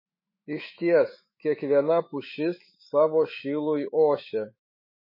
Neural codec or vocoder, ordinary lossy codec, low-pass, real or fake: autoencoder, 48 kHz, 128 numbers a frame, DAC-VAE, trained on Japanese speech; MP3, 24 kbps; 5.4 kHz; fake